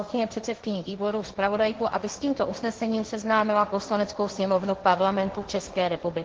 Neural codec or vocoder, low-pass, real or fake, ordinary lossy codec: codec, 16 kHz, 1.1 kbps, Voila-Tokenizer; 7.2 kHz; fake; Opus, 16 kbps